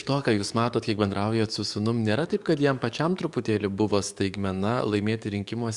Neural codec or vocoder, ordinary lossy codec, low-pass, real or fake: codec, 44.1 kHz, 7.8 kbps, DAC; Opus, 64 kbps; 10.8 kHz; fake